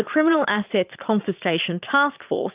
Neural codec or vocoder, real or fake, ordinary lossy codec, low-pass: vocoder, 22.05 kHz, 80 mel bands, Vocos; fake; Opus, 24 kbps; 3.6 kHz